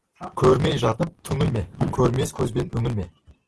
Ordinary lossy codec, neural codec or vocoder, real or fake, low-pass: Opus, 16 kbps; none; real; 10.8 kHz